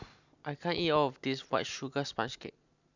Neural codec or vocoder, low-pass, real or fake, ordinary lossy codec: none; 7.2 kHz; real; none